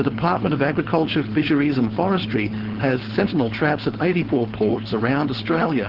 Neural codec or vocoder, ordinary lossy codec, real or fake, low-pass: codec, 16 kHz, 4.8 kbps, FACodec; Opus, 16 kbps; fake; 5.4 kHz